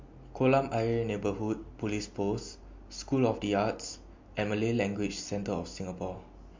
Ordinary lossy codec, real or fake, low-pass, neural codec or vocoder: MP3, 48 kbps; real; 7.2 kHz; none